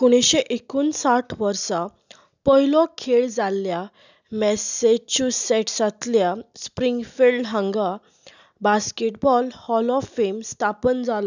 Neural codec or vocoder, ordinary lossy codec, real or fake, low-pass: none; none; real; 7.2 kHz